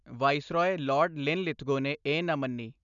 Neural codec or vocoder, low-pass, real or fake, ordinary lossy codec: none; 7.2 kHz; real; none